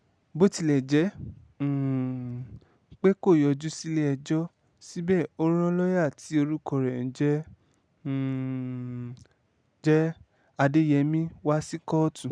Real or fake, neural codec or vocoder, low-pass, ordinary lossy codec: real; none; 9.9 kHz; none